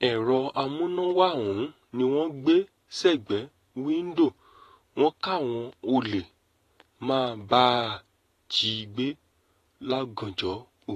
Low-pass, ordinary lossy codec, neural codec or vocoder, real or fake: 14.4 kHz; AAC, 48 kbps; vocoder, 48 kHz, 128 mel bands, Vocos; fake